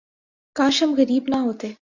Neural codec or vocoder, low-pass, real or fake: none; 7.2 kHz; real